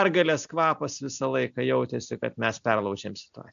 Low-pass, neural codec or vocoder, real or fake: 7.2 kHz; none; real